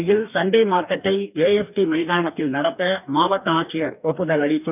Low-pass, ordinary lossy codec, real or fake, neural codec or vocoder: 3.6 kHz; none; fake; codec, 44.1 kHz, 2.6 kbps, DAC